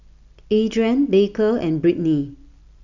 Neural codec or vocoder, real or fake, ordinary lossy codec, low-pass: none; real; none; 7.2 kHz